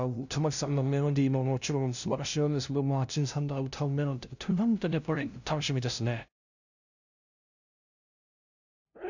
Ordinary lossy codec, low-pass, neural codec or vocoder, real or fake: none; 7.2 kHz; codec, 16 kHz, 0.5 kbps, FunCodec, trained on LibriTTS, 25 frames a second; fake